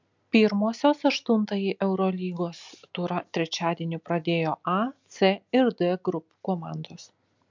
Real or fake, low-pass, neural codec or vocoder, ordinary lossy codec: real; 7.2 kHz; none; MP3, 64 kbps